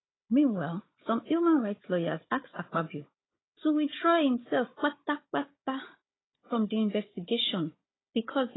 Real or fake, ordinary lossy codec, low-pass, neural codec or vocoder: fake; AAC, 16 kbps; 7.2 kHz; codec, 16 kHz, 16 kbps, FunCodec, trained on Chinese and English, 50 frames a second